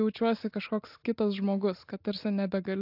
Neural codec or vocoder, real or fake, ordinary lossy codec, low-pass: none; real; AAC, 48 kbps; 5.4 kHz